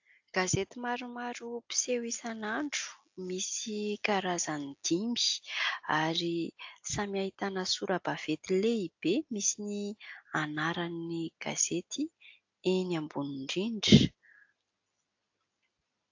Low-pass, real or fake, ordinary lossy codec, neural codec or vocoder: 7.2 kHz; real; AAC, 48 kbps; none